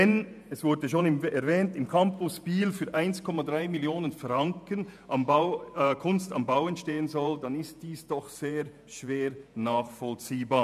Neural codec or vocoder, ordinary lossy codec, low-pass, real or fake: vocoder, 48 kHz, 128 mel bands, Vocos; none; 14.4 kHz; fake